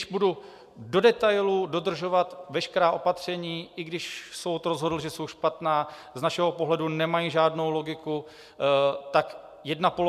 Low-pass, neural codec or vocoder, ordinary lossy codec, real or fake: 14.4 kHz; none; MP3, 96 kbps; real